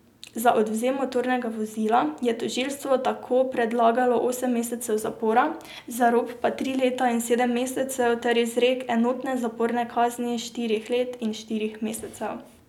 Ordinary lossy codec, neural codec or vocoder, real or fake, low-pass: none; none; real; 19.8 kHz